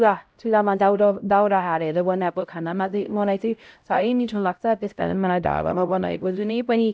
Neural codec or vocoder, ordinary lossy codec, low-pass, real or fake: codec, 16 kHz, 0.5 kbps, X-Codec, HuBERT features, trained on LibriSpeech; none; none; fake